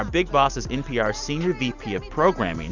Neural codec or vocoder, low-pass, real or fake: none; 7.2 kHz; real